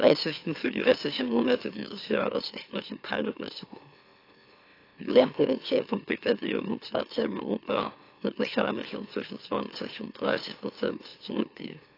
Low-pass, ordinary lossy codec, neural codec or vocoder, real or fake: 5.4 kHz; AAC, 32 kbps; autoencoder, 44.1 kHz, a latent of 192 numbers a frame, MeloTTS; fake